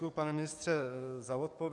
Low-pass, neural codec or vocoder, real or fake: 10.8 kHz; codec, 44.1 kHz, 7.8 kbps, DAC; fake